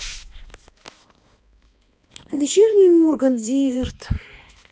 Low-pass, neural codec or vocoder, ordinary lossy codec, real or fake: none; codec, 16 kHz, 2 kbps, X-Codec, HuBERT features, trained on balanced general audio; none; fake